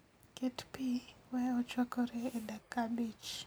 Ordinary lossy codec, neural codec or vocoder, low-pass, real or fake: none; none; none; real